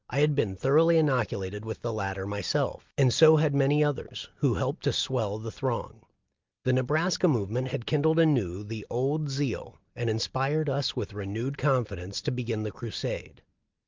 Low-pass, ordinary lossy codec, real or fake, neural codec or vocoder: 7.2 kHz; Opus, 24 kbps; real; none